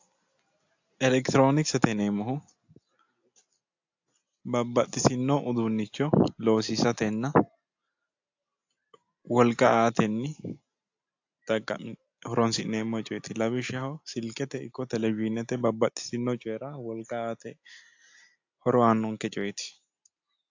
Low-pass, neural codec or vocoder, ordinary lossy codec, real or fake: 7.2 kHz; none; AAC, 48 kbps; real